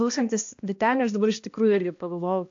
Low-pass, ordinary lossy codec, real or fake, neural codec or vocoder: 7.2 kHz; AAC, 48 kbps; fake; codec, 16 kHz, 1 kbps, X-Codec, HuBERT features, trained on balanced general audio